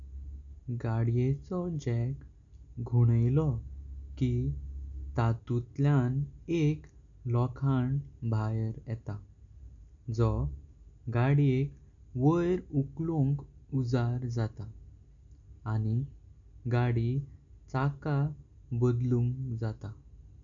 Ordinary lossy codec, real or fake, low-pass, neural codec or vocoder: none; real; 7.2 kHz; none